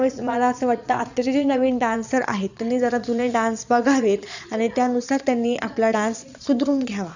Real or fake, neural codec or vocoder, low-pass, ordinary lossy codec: fake; vocoder, 22.05 kHz, 80 mel bands, Vocos; 7.2 kHz; none